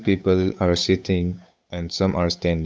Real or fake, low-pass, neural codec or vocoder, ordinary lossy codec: fake; none; codec, 16 kHz, 8 kbps, FunCodec, trained on Chinese and English, 25 frames a second; none